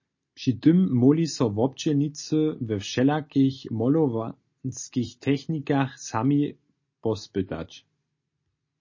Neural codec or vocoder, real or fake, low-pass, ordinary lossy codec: none; real; 7.2 kHz; MP3, 32 kbps